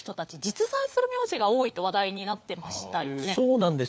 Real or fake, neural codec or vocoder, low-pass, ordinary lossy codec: fake; codec, 16 kHz, 4 kbps, FunCodec, trained on LibriTTS, 50 frames a second; none; none